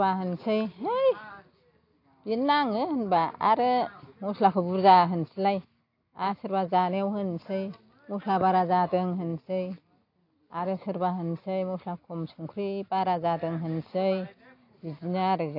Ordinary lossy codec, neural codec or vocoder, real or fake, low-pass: AAC, 32 kbps; none; real; 5.4 kHz